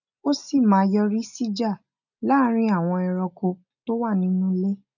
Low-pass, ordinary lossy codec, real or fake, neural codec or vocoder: 7.2 kHz; none; real; none